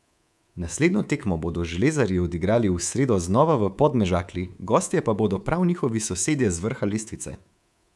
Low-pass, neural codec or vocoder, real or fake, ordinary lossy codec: none; codec, 24 kHz, 3.1 kbps, DualCodec; fake; none